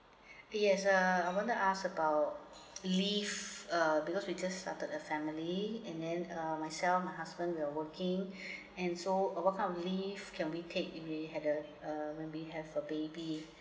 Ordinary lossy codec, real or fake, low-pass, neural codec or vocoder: none; real; none; none